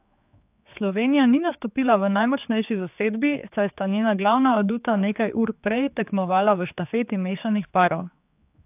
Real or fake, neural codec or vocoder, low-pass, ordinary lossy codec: fake; codec, 16 kHz, 4 kbps, X-Codec, HuBERT features, trained on general audio; 3.6 kHz; AAC, 32 kbps